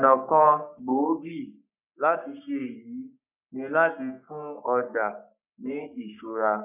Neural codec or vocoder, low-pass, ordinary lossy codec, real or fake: codec, 44.1 kHz, 3.4 kbps, Pupu-Codec; 3.6 kHz; none; fake